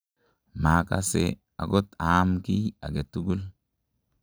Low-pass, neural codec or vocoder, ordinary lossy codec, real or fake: none; none; none; real